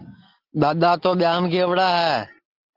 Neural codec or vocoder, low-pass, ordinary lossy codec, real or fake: none; 5.4 kHz; Opus, 16 kbps; real